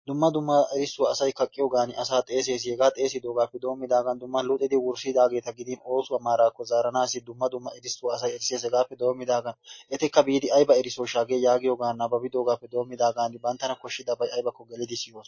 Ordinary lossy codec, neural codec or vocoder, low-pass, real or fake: MP3, 32 kbps; none; 7.2 kHz; real